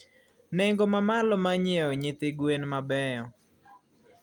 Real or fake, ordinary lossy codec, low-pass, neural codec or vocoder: real; Opus, 32 kbps; 19.8 kHz; none